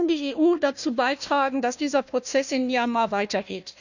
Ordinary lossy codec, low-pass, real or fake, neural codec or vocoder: none; 7.2 kHz; fake; codec, 16 kHz, 1 kbps, FunCodec, trained on Chinese and English, 50 frames a second